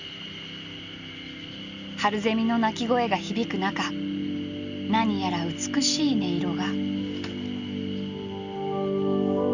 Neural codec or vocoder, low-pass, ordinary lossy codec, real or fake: none; 7.2 kHz; Opus, 64 kbps; real